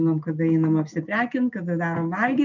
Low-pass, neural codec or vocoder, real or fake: 7.2 kHz; none; real